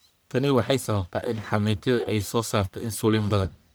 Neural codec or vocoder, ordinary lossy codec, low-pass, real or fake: codec, 44.1 kHz, 1.7 kbps, Pupu-Codec; none; none; fake